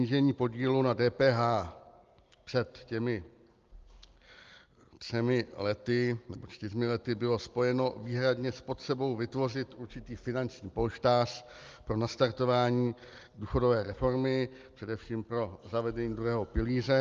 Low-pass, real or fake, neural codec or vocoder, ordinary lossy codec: 7.2 kHz; real; none; Opus, 32 kbps